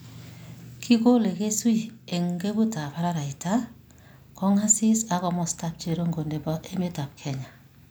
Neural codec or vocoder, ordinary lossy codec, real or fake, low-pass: none; none; real; none